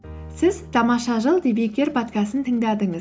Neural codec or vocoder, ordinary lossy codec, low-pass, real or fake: none; none; none; real